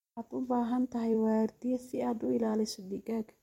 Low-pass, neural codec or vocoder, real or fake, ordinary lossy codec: 19.8 kHz; vocoder, 44.1 kHz, 128 mel bands every 256 samples, BigVGAN v2; fake; MP3, 64 kbps